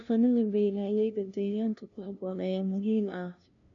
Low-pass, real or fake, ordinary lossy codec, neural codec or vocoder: 7.2 kHz; fake; none; codec, 16 kHz, 0.5 kbps, FunCodec, trained on LibriTTS, 25 frames a second